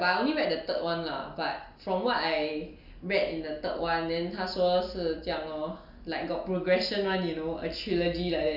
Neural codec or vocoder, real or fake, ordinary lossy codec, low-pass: none; real; none; 5.4 kHz